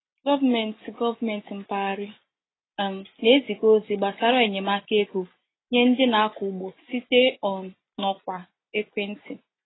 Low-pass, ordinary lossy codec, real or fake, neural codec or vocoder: 7.2 kHz; AAC, 16 kbps; real; none